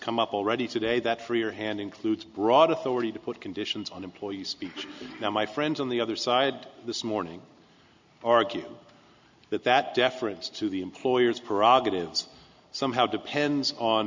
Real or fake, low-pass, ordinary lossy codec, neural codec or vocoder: real; 7.2 kHz; MP3, 64 kbps; none